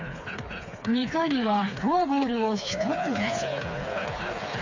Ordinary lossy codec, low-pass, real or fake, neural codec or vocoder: AAC, 48 kbps; 7.2 kHz; fake; codec, 16 kHz, 4 kbps, FreqCodec, smaller model